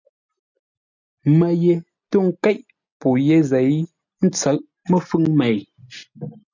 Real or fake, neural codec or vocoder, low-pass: real; none; 7.2 kHz